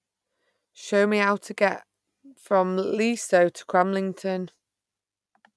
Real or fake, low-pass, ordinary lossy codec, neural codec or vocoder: real; none; none; none